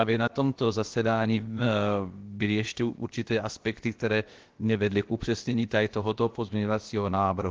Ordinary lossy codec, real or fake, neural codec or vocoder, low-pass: Opus, 16 kbps; fake; codec, 16 kHz, about 1 kbps, DyCAST, with the encoder's durations; 7.2 kHz